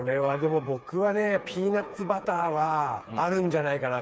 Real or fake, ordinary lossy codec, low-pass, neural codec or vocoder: fake; none; none; codec, 16 kHz, 4 kbps, FreqCodec, smaller model